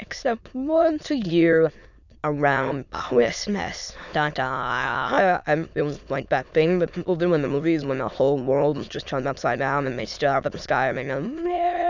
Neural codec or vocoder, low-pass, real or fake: autoencoder, 22.05 kHz, a latent of 192 numbers a frame, VITS, trained on many speakers; 7.2 kHz; fake